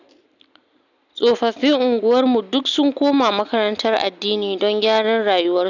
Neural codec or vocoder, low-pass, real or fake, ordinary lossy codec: none; 7.2 kHz; real; none